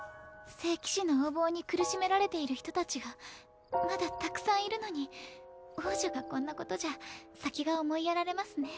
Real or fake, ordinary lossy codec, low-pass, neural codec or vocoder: real; none; none; none